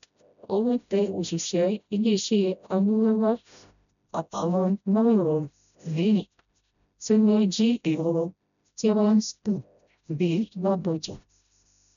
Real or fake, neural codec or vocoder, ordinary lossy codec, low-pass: fake; codec, 16 kHz, 0.5 kbps, FreqCodec, smaller model; none; 7.2 kHz